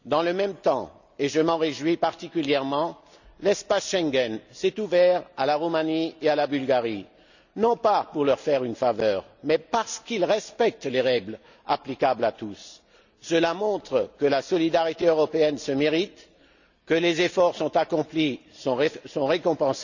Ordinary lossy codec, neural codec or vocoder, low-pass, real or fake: none; none; 7.2 kHz; real